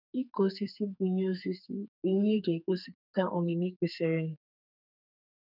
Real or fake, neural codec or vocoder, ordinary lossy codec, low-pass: fake; codec, 44.1 kHz, 2.6 kbps, SNAC; none; 5.4 kHz